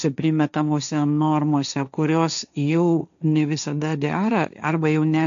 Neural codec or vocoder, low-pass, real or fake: codec, 16 kHz, 1.1 kbps, Voila-Tokenizer; 7.2 kHz; fake